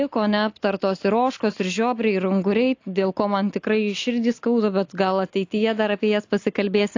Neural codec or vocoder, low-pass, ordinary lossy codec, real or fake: none; 7.2 kHz; AAC, 48 kbps; real